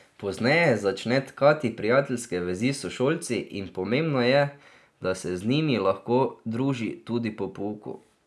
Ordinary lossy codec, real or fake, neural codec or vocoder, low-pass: none; real; none; none